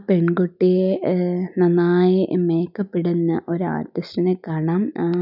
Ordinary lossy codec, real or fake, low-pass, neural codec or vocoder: none; real; 5.4 kHz; none